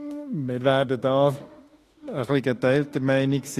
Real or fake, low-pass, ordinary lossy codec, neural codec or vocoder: fake; 14.4 kHz; AAC, 48 kbps; autoencoder, 48 kHz, 32 numbers a frame, DAC-VAE, trained on Japanese speech